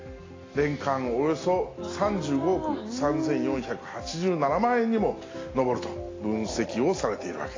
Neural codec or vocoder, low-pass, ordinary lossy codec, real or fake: none; 7.2 kHz; AAC, 32 kbps; real